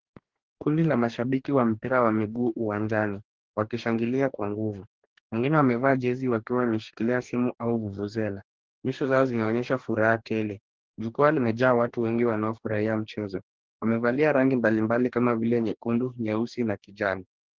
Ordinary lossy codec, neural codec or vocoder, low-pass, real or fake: Opus, 16 kbps; codec, 44.1 kHz, 2.6 kbps, DAC; 7.2 kHz; fake